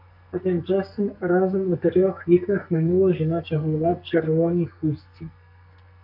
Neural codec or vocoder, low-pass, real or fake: codec, 44.1 kHz, 2.6 kbps, SNAC; 5.4 kHz; fake